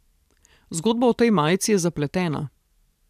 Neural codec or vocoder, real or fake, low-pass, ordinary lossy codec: vocoder, 44.1 kHz, 128 mel bands every 512 samples, BigVGAN v2; fake; 14.4 kHz; none